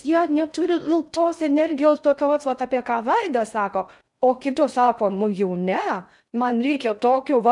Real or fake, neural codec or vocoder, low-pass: fake; codec, 16 kHz in and 24 kHz out, 0.6 kbps, FocalCodec, streaming, 2048 codes; 10.8 kHz